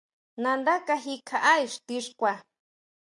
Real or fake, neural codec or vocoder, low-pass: real; none; 10.8 kHz